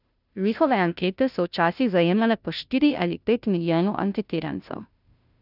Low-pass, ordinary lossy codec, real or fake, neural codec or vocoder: 5.4 kHz; none; fake; codec, 16 kHz, 0.5 kbps, FunCodec, trained on Chinese and English, 25 frames a second